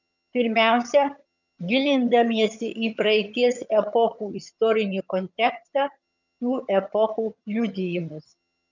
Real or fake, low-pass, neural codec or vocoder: fake; 7.2 kHz; vocoder, 22.05 kHz, 80 mel bands, HiFi-GAN